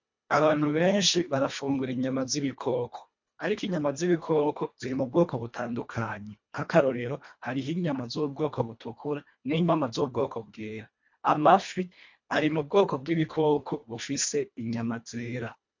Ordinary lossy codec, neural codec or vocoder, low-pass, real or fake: MP3, 48 kbps; codec, 24 kHz, 1.5 kbps, HILCodec; 7.2 kHz; fake